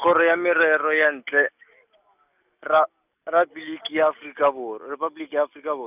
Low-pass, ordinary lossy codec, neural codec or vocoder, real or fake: 3.6 kHz; none; none; real